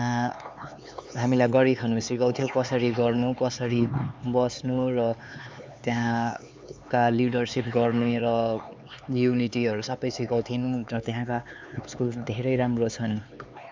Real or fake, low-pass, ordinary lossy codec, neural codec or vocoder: fake; none; none; codec, 16 kHz, 4 kbps, X-Codec, HuBERT features, trained on LibriSpeech